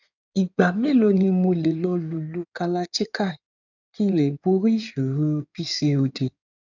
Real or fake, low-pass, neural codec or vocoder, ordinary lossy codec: fake; 7.2 kHz; codec, 16 kHz in and 24 kHz out, 2.2 kbps, FireRedTTS-2 codec; none